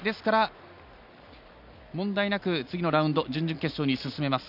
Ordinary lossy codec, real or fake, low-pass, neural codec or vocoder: none; real; 5.4 kHz; none